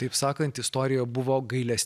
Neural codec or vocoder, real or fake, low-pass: none; real; 14.4 kHz